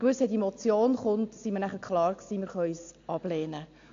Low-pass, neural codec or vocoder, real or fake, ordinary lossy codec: 7.2 kHz; none; real; AAC, 48 kbps